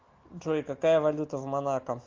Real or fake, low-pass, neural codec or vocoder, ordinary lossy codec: real; 7.2 kHz; none; Opus, 32 kbps